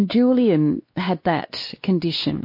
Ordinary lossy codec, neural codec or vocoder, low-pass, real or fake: MP3, 32 kbps; codec, 16 kHz, 2 kbps, X-Codec, WavLM features, trained on Multilingual LibriSpeech; 5.4 kHz; fake